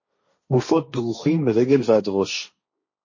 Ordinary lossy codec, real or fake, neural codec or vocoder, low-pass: MP3, 32 kbps; fake; codec, 16 kHz, 1.1 kbps, Voila-Tokenizer; 7.2 kHz